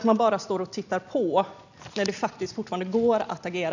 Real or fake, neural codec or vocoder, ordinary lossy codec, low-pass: real; none; none; 7.2 kHz